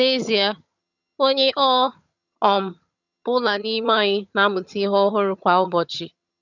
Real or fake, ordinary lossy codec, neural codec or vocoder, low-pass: fake; none; vocoder, 22.05 kHz, 80 mel bands, HiFi-GAN; 7.2 kHz